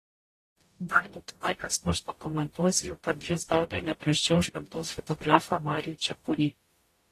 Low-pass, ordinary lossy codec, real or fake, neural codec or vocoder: 14.4 kHz; AAC, 48 kbps; fake; codec, 44.1 kHz, 0.9 kbps, DAC